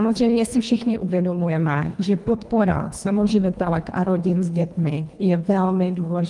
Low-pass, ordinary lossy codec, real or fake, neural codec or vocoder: 10.8 kHz; Opus, 32 kbps; fake; codec, 24 kHz, 1.5 kbps, HILCodec